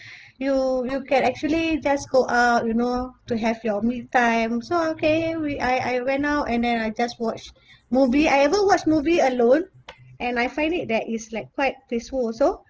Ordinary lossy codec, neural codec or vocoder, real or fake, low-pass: Opus, 16 kbps; none; real; 7.2 kHz